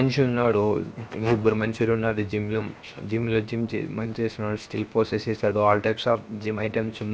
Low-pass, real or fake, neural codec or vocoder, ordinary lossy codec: none; fake; codec, 16 kHz, 0.7 kbps, FocalCodec; none